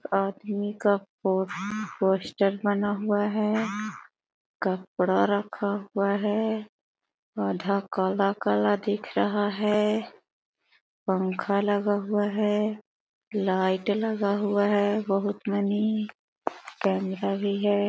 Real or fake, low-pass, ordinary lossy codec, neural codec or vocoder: real; none; none; none